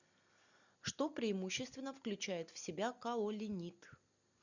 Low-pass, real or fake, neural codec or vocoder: 7.2 kHz; real; none